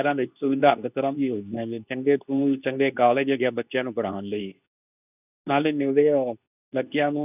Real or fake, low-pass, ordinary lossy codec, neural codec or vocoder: fake; 3.6 kHz; none; codec, 24 kHz, 0.9 kbps, WavTokenizer, medium speech release version 2